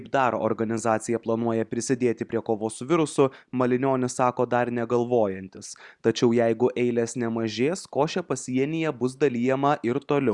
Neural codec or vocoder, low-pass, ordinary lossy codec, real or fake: none; 10.8 kHz; Opus, 64 kbps; real